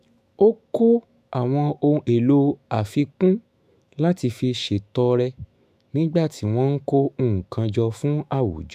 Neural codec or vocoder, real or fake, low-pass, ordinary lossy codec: autoencoder, 48 kHz, 128 numbers a frame, DAC-VAE, trained on Japanese speech; fake; 14.4 kHz; AAC, 96 kbps